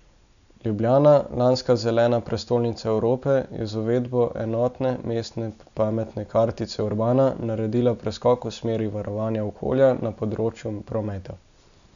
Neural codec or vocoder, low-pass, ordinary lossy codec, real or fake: none; 7.2 kHz; none; real